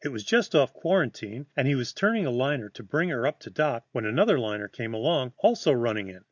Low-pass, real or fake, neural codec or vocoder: 7.2 kHz; real; none